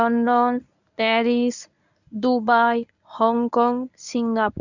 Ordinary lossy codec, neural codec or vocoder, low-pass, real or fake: none; codec, 24 kHz, 0.9 kbps, WavTokenizer, medium speech release version 1; 7.2 kHz; fake